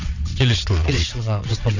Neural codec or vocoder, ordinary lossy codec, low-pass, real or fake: vocoder, 22.05 kHz, 80 mel bands, WaveNeXt; none; 7.2 kHz; fake